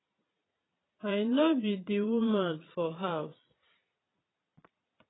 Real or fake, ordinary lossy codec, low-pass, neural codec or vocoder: fake; AAC, 16 kbps; 7.2 kHz; vocoder, 22.05 kHz, 80 mel bands, Vocos